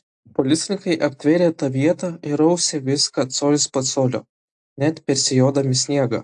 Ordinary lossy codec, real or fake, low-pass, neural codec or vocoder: AAC, 64 kbps; real; 9.9 kHz; none